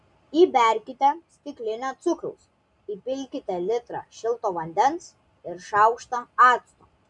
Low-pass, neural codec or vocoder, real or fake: 10.8 kHz; none; real